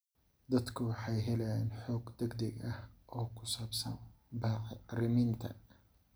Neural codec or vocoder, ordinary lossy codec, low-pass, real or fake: none; none; none; real